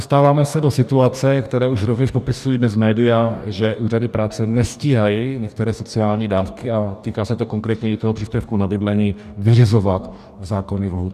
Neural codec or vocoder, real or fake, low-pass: codec, 44.1 kHz, 2.6 kbps, DAC; fake; 14.4 kHz